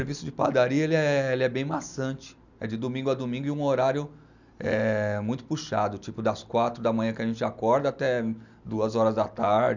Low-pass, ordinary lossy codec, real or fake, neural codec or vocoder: 7.2 kHz; none; real; none